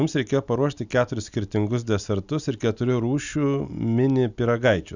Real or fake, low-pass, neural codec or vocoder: real; 7.2 kHz; none